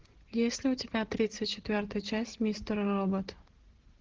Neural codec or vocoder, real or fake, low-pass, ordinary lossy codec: none; real; 7.2 kHz; Opus, 16 kbps